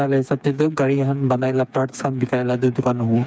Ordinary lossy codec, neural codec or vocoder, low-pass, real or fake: none; codec, 16 kHz, 4 kbps, FreqCodec, smaller model; none; fake